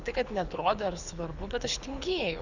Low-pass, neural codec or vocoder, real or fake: 7.2 kHz; codec, 24 kHz, 6 kbps, HILCodec; fake